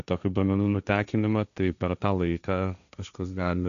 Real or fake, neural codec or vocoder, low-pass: fake; codec, 16 kHz, 1.1 kbps, Voila-Tokenizer; 7.2 kHz